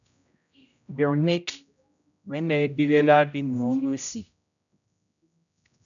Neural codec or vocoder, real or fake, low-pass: codec, 16 kHz, 0.5 kbps, X-Codec, HuBERT features, trained on general audio; fake; 7.2 kHz